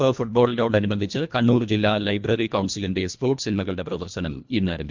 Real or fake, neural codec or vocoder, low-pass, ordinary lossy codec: fake; codec, 24 kHz, 1.5 kbps, HILCodec; 7.2 kHz; MP3, 64 kbps